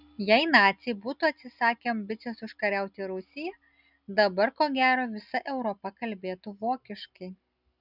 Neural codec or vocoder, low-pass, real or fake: none; 5.4 kHz; real